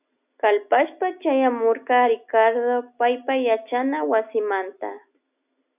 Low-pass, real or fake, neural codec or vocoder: 3.6 kHz; real; none